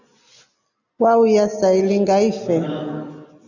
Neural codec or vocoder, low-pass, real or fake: none; 7.2 kHz; real